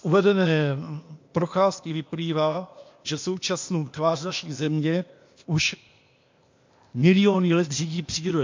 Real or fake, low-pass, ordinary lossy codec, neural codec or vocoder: fake; 7.2 kHz; MP3, 48 kbps; codec, 16 kHz, 0.8 kbps, ZipCodec